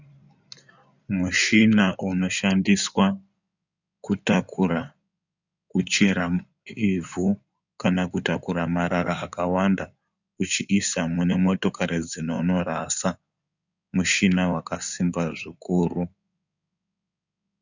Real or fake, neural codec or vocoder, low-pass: fake; codec, 16 kHz in and 24 kHz out, 2.2 kbps, FireRedTTS-2 codec; 7.2 kHz